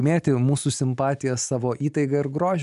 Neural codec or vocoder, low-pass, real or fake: none; 10.8 kHz; real